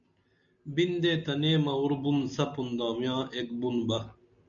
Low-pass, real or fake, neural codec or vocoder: 7.2 kHz; real; none